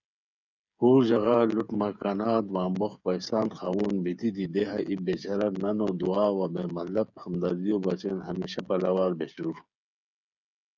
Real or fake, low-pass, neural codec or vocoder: fake; 7.2 kHz; codec, 16 kHz, 8 kbps, FreqCodec, smaller model